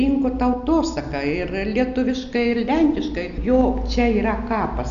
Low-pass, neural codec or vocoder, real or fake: 7.2 kHz; none; real